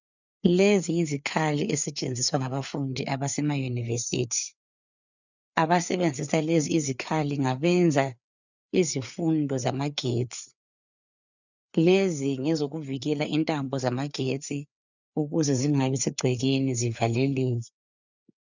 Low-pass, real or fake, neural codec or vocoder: 7.2 kHz; fake; codec, 16 kHz, 4 kbps, FreqCodec, larger model